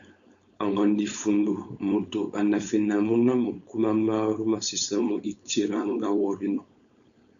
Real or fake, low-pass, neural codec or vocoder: fake; 7.2 kHz; codec, 16 kHz, 4.8 kbps, FACodec